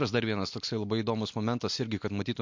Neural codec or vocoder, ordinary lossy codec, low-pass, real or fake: none; MP3, 48 kbps; 7.2 kHz; real